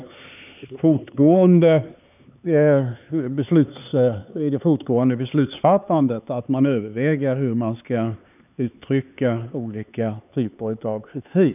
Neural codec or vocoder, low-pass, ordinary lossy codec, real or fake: codec, 16 kHz, 4 kbps, X-Codec, HuBERT features, trained on LibriSpeech; 3.6 kHz; none; fake